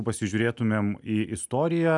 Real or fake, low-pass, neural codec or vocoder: real; 10.8 kHz; none